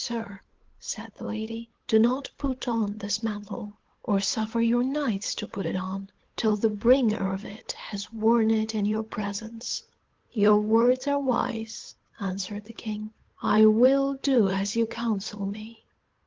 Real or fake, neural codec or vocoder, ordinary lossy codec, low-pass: fake; codec, 16 kHz, 8 kbps, FunCodec, trained on Chinese and English, 25 frames a second; Opus, 16 kbps; 7.2 kHz